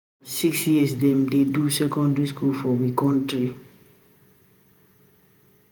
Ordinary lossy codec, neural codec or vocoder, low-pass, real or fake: none; vocoder, 48 kHz, 128 mel bands, Vocos; none; fake